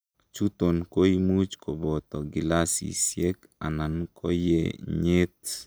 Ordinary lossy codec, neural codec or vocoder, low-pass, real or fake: none; none; none; real